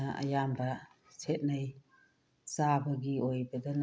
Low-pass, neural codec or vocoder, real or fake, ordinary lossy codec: none; none; real; none